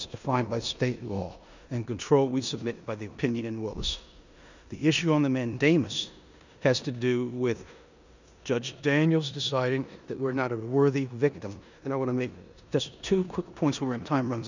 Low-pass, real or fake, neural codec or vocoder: 7.2 kHz; fake; codec, 16 kHz in and 24 kHz out, 0.9 kbps, LongCat-Audio-Codec, four codebook decoder